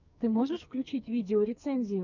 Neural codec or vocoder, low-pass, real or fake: codec, 44.1 kHz, 2.6 kbps, SNAC; 7.2 kHz; fake